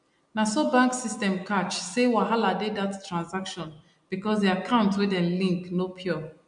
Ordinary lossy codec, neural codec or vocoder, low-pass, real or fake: MP3, 64 kbps; none; 9.9 kHz; real